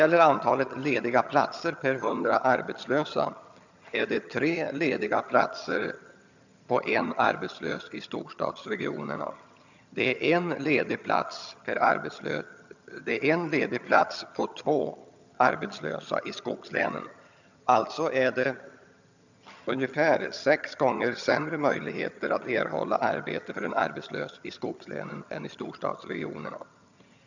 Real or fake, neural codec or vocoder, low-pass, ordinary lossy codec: fake; vocoder, 22.05 kHz, 80 mel bands, HiFi-GAN; 7.2 kHz; none